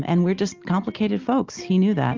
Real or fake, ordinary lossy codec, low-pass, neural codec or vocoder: real; Opus, 32 kbps; 7.2 kHz; none